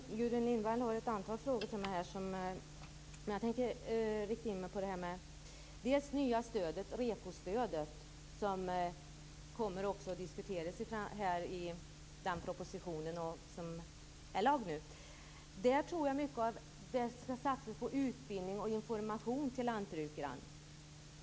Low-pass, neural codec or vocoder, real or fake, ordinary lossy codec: none; none; real; none